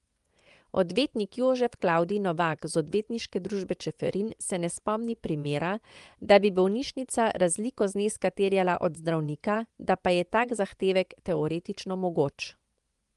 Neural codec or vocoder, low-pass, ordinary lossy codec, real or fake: vocoder, 24 kHz, 100 mel bands, Vocos; 10.8 kHz; Opus, 32 kbps; fake